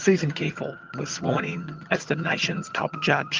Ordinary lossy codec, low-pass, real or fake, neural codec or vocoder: Opus, 24 kbps; 7.2 kHz; fake; vocoder, 22.05 kHz, 80 mel bands, HiFi-GAN